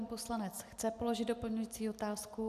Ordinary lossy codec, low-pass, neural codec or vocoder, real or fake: AAC, 96 kbps; 14.4 kHz; none; real